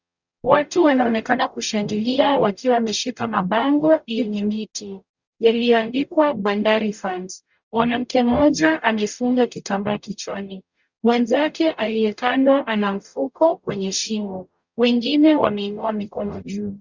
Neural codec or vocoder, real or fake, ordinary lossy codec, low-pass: codec, 44.1 kHz, 0.9 kbps, DAC; fake; Opus, 64 kbps; 7.2 kHz